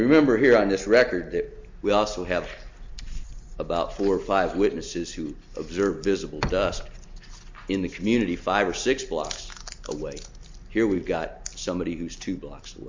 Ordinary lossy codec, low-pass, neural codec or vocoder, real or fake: MP3, 64 kbps; 7.2 kHz; none; real